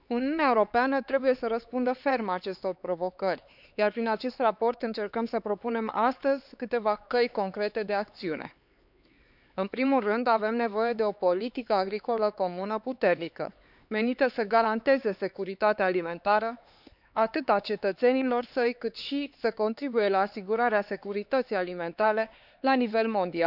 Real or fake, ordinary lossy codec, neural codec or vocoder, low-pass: fake; none; codec, 16 kHz, 4 kbps, X-Codec, HuBERT features, trained on LibriSpeech; 5.4 kHz